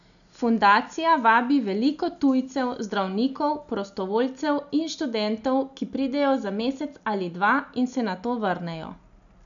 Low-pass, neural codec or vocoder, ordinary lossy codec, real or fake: 7.2 kHz; none; none; real